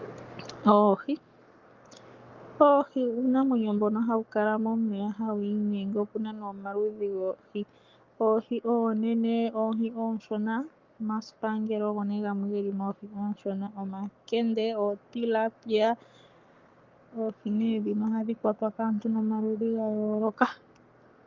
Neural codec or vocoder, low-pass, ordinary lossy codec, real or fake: codec, 44.1 kHz, 7.8 kbps, Pupu-Codec; 7.2 kHz; Opus, 24 kbps; fake